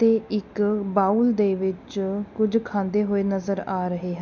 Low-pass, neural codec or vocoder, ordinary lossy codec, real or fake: 7.2 kHz; none; none; real